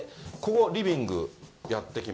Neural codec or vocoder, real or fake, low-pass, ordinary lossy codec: none; real; none; none